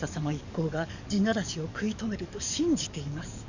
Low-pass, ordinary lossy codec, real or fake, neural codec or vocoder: 7.2 kHz; none; fake; codec, 44.1 kHz, 7.8 kbps, DAC